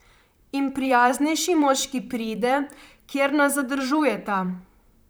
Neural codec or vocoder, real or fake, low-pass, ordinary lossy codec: vocoder, 44.1 kHz, 128 mel bands, Pupu-Vocoder; fake; none; none